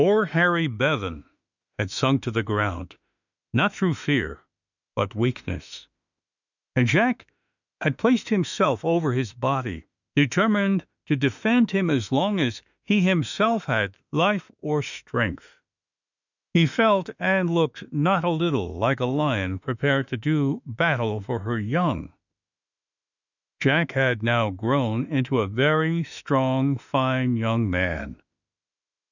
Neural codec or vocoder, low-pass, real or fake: autoencoder, 48 kHz, 32 numbers a frame, DAC-VAE, trained on Japanese speech; 7.2 kHz; fake